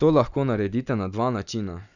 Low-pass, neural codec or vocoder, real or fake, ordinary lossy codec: 7.2 kHz; none; real; none